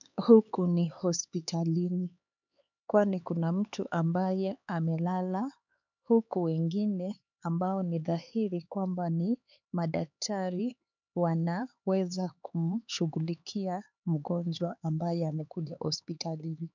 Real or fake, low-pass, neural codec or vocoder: fake; 7.2 kHz; codec, 16 kHz, 4 kbps, X-Codec, HuBERT features, trained on LibriSpeech